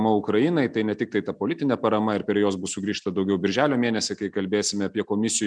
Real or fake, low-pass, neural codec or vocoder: real; 9.9 kHz; none